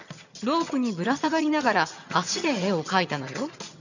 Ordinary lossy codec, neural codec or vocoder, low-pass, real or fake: none; vocoder, 22.05 kHz, 80 mel bands, HiFi-GAN; 7.2 kHz; fake